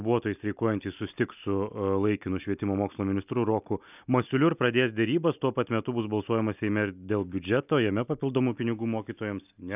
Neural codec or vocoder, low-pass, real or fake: none; 3.6 kHz; real